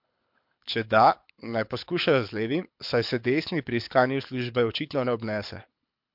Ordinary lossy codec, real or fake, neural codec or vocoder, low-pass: MP3, 48 kbps; fake; codec, 24 kHz, 6 kbps, HILCodec; 5.4 kHz